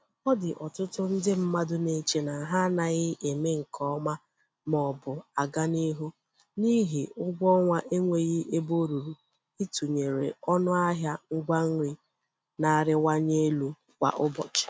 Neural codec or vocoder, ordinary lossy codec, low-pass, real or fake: none; none; none; real